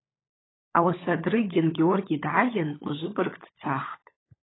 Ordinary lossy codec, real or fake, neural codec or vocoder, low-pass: AAC, 16 kbps; fake; codec, 16 kHz, 16 kbps, FunCodec, trained on LibriTTS, 50 frames a second; 7.2 kHz